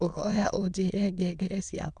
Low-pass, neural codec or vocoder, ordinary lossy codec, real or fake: 9.9 kHz; autoencoder, 22.05 kHz, a latent of 192 numbers a frame, VITS, trained on many speakers; none; fake